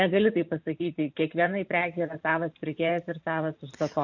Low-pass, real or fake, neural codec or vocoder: 7.2 kHz; fake; vocoder, 44.1 kHz, 128 mel bands every 512 samples, BigVGAN v2